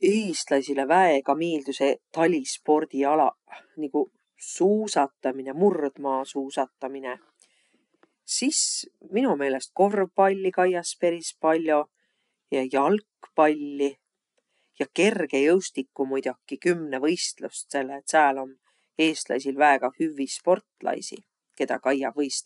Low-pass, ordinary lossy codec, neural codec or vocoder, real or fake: 10.8 kHz; none; none; real